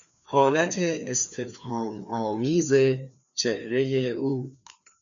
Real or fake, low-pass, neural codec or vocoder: fake; 7.2 kHz; codec, 16 kHz, 2 kbps, FreqCodec, larger model